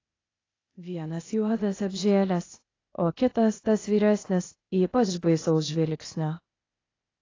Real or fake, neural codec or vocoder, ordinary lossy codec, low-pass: fake; codec, 16 kHz, 0.8 kbps, ZipCodec; AAC, 32 kbps; 7.2 kHz